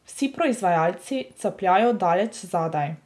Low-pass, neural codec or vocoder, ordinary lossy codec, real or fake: none; none; none; real